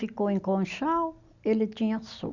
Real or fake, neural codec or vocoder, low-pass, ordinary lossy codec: real; none; 7.2 kHz; none